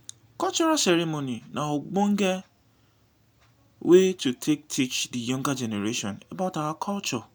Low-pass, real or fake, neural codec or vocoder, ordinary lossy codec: none; real; none; none